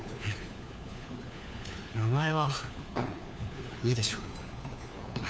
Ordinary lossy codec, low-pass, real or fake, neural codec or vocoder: none; none; fake; codec, 16 kHz, 4 kbps, FunCodec, trained on LibriTTS, 50 frames a second